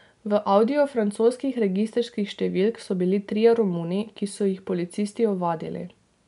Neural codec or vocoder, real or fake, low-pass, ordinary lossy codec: none; real; 10.8 kHz; none